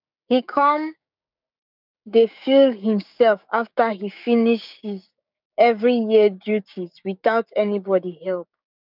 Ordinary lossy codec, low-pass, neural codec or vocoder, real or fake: none; 5.4 kHz; codec, 16 kHz, 6 kbps, DAC; fake